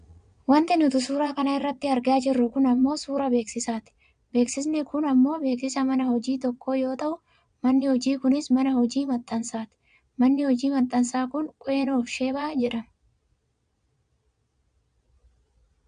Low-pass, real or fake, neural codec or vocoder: 9.9 kHz; fake; vocoder, 22.05 kHz, 80 mel bands, Vocos